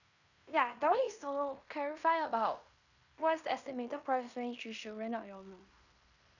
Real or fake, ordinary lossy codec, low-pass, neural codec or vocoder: fake; none; 7.2 kHz; codec, 16 kHz in and 24 kHz out, 0.9 kbps, LongCat-Audio-Codec, fine tuned four codebook decoder